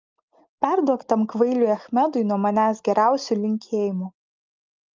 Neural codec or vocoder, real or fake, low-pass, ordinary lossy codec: none; real; 7.2 kHz; Opus, 32 kbps